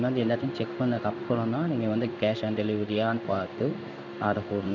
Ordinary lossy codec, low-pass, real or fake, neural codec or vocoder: none; 7.2 kHz; fake; codec, 16 kHz in and 24 kHz out, 1 kbps, XY-Tokenizer